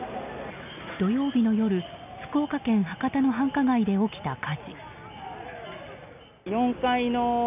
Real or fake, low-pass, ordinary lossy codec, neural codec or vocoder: real; 3.6 kHz; none; none